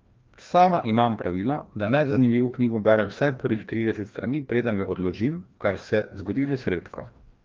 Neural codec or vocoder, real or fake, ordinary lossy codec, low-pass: codec, 16 kHz, 1 kbps, FreqCodec, larger model; fake; Opus, 32 kbps; 7.2 kHz